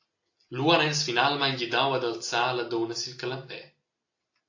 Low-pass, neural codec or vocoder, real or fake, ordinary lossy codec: 7.2 kHz; none; real; AAC, 48 kbps